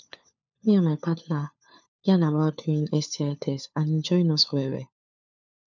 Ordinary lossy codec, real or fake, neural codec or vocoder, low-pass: none; fake; codec, 16 kHz, 4 kbps, FunCodec, trained on LibriTTS, 50 frames a second; 7.2 kHz